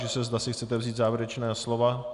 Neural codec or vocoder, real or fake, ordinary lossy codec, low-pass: none; real; AAC, 96 kbps; 10.8 kHz